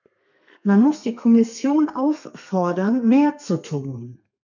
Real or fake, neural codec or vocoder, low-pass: fake; codec, 32 kHz, 1.9 kbps, SNAC; 7.2 kHz